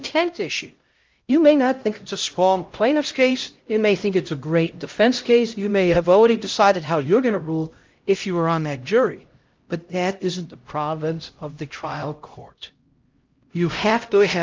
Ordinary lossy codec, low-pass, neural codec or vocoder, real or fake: Opus, 24 kbps; 7.2 kHz; codec, 16 kHz, 0.5 kbps, X-Codec, HuBERT features, trained on LibriSpeech; fake